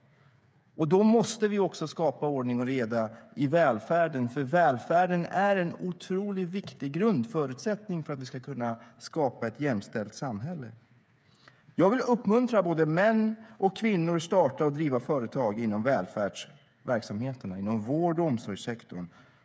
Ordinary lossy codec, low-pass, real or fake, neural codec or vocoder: none; none; fake; codec, 16 kHz, 8 kbps, FreqCodec, smaller model